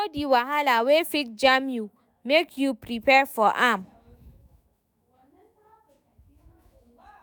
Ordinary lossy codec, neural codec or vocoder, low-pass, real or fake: none; autoencoder, 48 kHz, 128 numbers a frame, DAC-VAE, trained on Japanese speech; none; fake